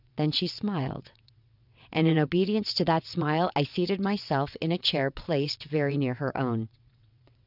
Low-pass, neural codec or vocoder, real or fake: 5.4 kHz; vocoder, 22.05 kHz, 80 mel bands, WaveNeXt; fake